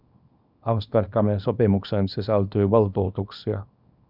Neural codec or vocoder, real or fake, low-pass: codec, 24 kHz, 0.9 kbps, WavTokenizer, small release; fake; 5.4 kHz